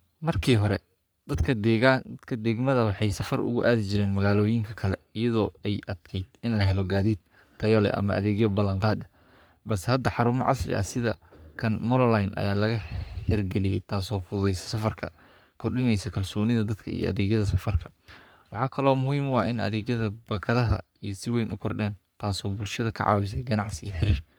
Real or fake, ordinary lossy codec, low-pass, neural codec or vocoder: fake; none; none; codec, 44.1 kHz, 3.4 kbps, Pupu-Codec